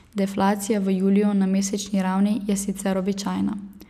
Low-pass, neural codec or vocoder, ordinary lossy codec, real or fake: 14.4 kHz; none; none; real